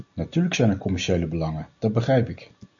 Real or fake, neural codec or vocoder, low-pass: real; none; 7.2 kHz